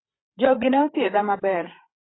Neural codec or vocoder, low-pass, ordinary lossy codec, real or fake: codec, 16 kHz, 8 kbps, FreqCodec, larger model; 7.2 kHz; AAC, 16 kbps; fake